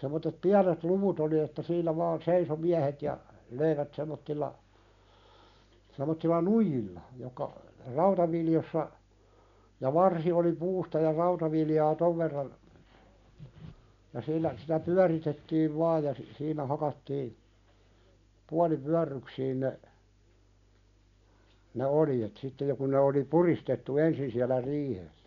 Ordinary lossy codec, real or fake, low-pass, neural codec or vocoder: none; real; 7.2 kHz; none